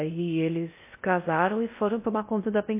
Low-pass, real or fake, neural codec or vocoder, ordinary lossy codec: 3.6 kHz; fake; codec, 16 kHz in and 24 kHz out, 0.6 kbps, FocalCodec, streaming, 4096 codes; MP3, 24 kbps